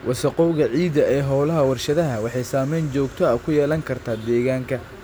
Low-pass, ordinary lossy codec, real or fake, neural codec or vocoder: none; none; real; none